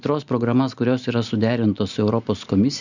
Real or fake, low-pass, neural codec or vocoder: real; 7.2 kHz; none